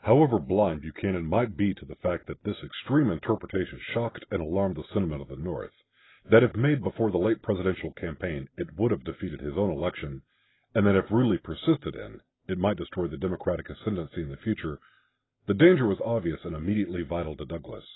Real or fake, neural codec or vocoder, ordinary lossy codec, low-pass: real; none; AAC, 16 kbps; 7.2 kHz